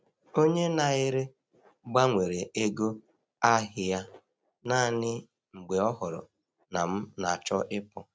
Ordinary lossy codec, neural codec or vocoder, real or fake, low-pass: none; none; real; none